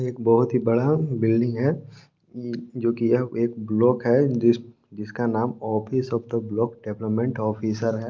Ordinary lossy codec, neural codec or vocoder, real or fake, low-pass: Opus, 32 kbps; none; real; 7.2 kHz